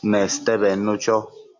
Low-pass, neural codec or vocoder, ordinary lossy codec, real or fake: 7.2 kHz; none; MP3, 48 kbps; real